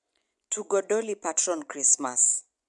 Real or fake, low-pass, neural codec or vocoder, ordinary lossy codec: fake; 10.8 kHz; vocoder, 24 kHz, 100 mel bands, Vocos; none